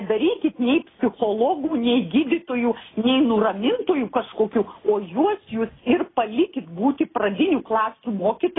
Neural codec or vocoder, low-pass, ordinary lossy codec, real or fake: none; 7.2 kHz; AAC, 16 kbps; real